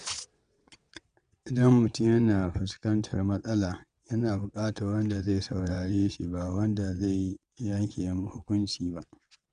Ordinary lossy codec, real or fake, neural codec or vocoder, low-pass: Opus, 64 kbps; fake; vocoder, 22.05 kHz, 80 mel bands, WaveNeXt; 9.9 kHz